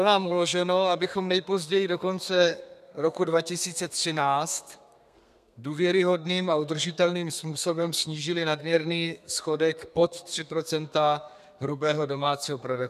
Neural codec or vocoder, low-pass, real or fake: codec, 32 kHz, 1.9 kbps, SNAC; 14.4 kHz; fake